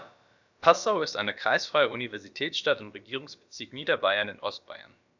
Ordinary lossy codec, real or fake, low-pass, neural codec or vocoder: none; fake; 7.2 kHz; codec, 16 kHz, about 1 kbps, DyCAST, with the encoder's durations